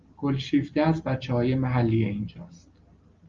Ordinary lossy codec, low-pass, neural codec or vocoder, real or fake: Opus, 32 kbps; 7.2 kHz; none; real